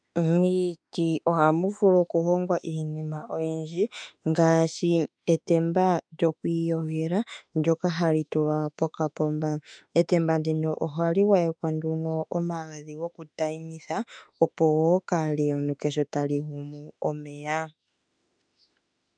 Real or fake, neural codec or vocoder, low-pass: fake; autoencoder, 48 kHz, 32 numbers a frame, DAC-VAE, trained on Japanese speech; 9.9 kHz